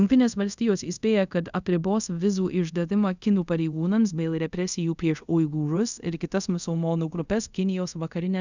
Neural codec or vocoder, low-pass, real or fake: codec, 24 kHz, 0.5 kbps, DualCodec; 7.2 kHz; fake